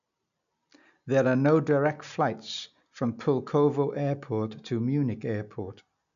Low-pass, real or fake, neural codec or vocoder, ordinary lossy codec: 7.2 kHz; real; none; none